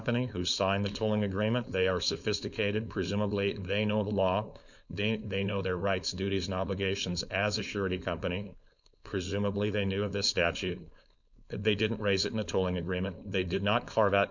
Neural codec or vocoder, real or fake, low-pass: codec, 16 kHz, 4.8 kbps, FACodec; fake; 7.2 kHz